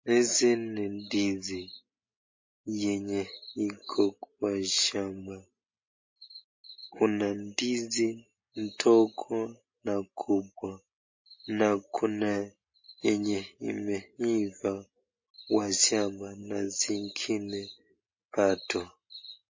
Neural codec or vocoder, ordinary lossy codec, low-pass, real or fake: none; MP3, 32 kbps; 7.2 kHz; real